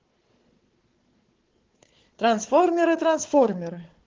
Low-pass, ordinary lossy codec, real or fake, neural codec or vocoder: 7.2 kHz; Opus, 16 kbps; fake; codec, 16 kHz, 4 kbps, FunCodec, trained on Chinese and English, 50 frames a second